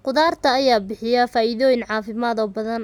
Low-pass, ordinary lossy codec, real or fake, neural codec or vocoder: 19.8 kHz; none; real; none